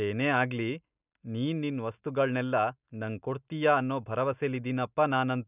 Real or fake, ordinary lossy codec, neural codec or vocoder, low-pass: real; none; none; 3.6 kHz